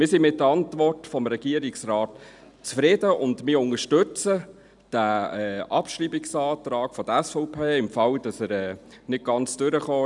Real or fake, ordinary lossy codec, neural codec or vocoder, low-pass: real; none; none; 10.8 kHz